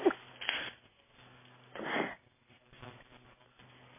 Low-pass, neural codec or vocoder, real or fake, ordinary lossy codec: 3.6 kHz; none; real; MP3, 16 kbps